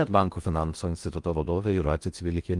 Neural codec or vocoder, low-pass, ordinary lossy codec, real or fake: codec, 16 kHz in and 24 kHz out, 0.6 kbps, FocalCodec, streaming, 2048 codes; 10.8 kHz; Opus, 32 kbps; fake